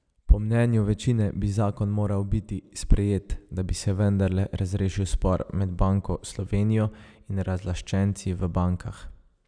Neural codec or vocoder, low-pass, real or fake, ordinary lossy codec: none; 9.9 kHz; real; none